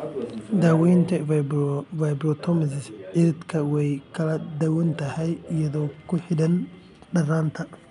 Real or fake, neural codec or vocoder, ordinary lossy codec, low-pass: real; none; none; 10.8 kHz